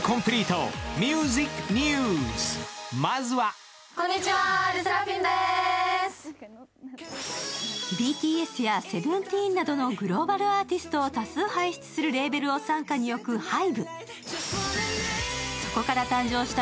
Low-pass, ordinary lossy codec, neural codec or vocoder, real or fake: none; none; none; real